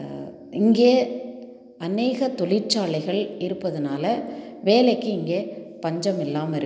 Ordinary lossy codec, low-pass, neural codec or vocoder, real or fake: none; none; none; real